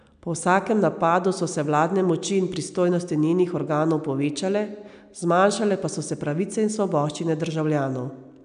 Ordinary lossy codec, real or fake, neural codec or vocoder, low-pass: none; real; none; 9.9 kHz